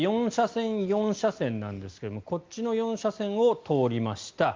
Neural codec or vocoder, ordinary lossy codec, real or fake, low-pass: none; Opus, 24 kbps; real; 7.2 kHz